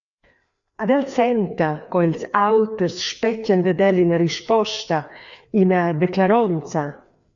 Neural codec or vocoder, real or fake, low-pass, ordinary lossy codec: codec, 16 kHz, 2 kbps, FreqCodec, larger model; fake; 7.2 kHz; AAC, 64 kbps